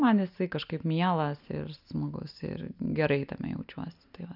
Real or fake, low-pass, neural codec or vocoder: real; 5.4 kHz; none